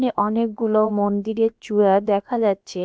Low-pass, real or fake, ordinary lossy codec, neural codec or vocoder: none; fake; none; codec, 16 kHz, about 1 kbps, DyCAST, with the encoder's durations